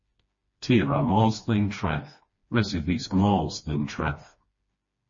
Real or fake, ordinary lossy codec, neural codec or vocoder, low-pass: fake; MP3, 32 kbps; codec, 16 kHz, 2 kbps, FreqCodec, smaller model; 7.2 kHz